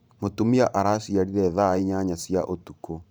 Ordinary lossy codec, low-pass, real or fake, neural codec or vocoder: none; none; fake; vocoder, 44.1 kHz, 128 mel bands every 512 samples, BigVGAN v2